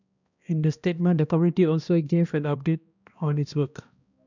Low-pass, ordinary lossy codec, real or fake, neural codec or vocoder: 7.2 kHz; none; fake; codec, 16 kHz, 1 kbps, X-Codec, HuBERT features, trained on balanced general audio